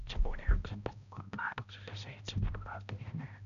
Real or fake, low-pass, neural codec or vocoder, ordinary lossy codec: fake; 7.2 kHz; codec, 16 kHz, 0.5 kbps, X-Codec, HuBERT features, trained on balanced general audio; none